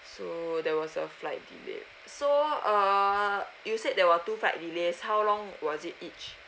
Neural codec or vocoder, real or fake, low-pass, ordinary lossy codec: none; real; none; none